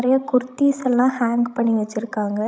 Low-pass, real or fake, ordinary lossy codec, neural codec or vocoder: none; fake; none; codec, 16 kHz, 16 kbps, FreqCodec, larger model